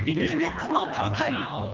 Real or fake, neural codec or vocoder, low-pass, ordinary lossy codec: fake; codec, 24 kHz, 1.5 kbps, HILCodec; 7.2 kHz; Opus, 24 kbps